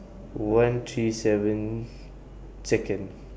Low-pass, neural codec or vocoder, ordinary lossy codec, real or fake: none; none; none; real